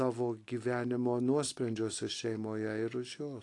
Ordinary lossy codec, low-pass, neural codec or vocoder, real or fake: AAC, 48 kbps; 10.8 kHz; none; real